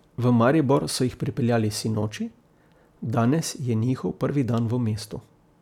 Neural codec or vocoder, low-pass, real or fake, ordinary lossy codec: none; 19.8 kHz; real; none